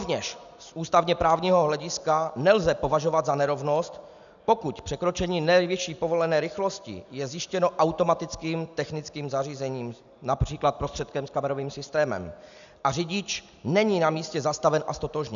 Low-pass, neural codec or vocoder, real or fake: 7.2 kHz; none; real